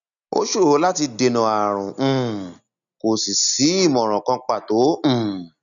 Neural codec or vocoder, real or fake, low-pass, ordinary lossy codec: none; real; 7.2 kHz; none